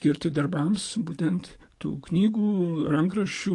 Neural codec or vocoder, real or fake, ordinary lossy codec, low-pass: codec, 44.1 kHz, 7.8 kbps, DAC; fake; AAC, 48 kbps; 10.8 kHz